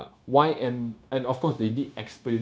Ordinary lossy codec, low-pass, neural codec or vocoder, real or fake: none; none; codec, 16 kHz, 0.9 kbps, LongCat-Audio-Codec; fake